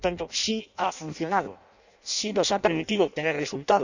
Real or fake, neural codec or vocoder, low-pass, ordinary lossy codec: fake; codec, 16 kHz in and 24 kHz out, 0.6 kbps, FireRedTTS-2 codec; 7.2 kHz; none